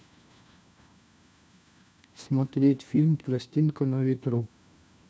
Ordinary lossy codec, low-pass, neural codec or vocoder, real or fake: none; none; codec, 16 kHz, 1 kbps, FunCodec, trained on LibriTTS, 50 frames a second; fake